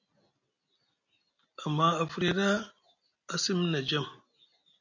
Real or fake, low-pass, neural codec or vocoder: real; 7.2 kHz; none